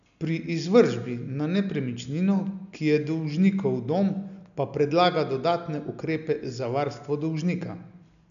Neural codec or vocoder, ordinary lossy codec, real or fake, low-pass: none; none; real; 7.2 kHz